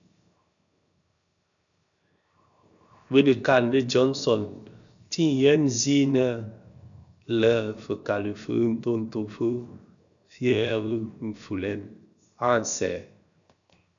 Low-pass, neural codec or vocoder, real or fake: 7.2 kHz; codec, 16 kHz, 0.7 kbps, FocalCodec; fake